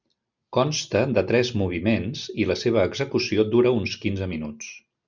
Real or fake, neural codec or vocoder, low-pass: real; none; 7.2 kHz